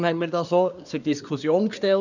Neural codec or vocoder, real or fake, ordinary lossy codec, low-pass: codec, 24 kHz, 1 kbps, SNAC; fake; none; 7.2 kHz